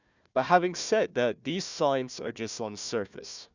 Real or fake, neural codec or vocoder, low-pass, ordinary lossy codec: fake; codec, 16 kHz, 1 kbps, FunCodec, trained on Chinese and English, 50 frames a second; 7.2 kHz; none